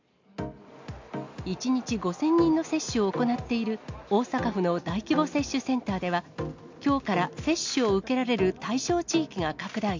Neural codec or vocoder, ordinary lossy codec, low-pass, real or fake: none; MP3, 64 kbps; 7.2 kHz; real